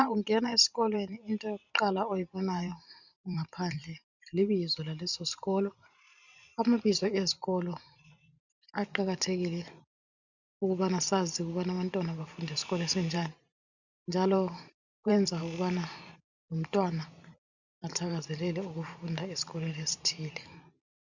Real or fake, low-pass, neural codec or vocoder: fake; 7.2 kHz; vocoder, 44.1 kHz, 128 mel bands every 512 samples, BigVGAN v2